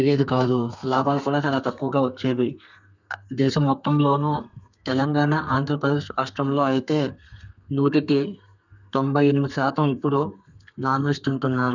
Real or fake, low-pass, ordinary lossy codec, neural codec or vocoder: fake; 7.2 kHz; none; codec, 32 kHz, 1.9 kbps, SNAC